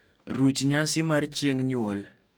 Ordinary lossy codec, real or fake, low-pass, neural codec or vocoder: none; fake; none; codec, 44.1 kHz, 2.6 kbps, DAC